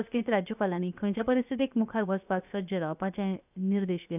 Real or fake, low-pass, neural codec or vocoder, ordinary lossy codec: fake; 3.6 kHz; codec, 16 kHz, 0.7 kbps, FocalCodec; none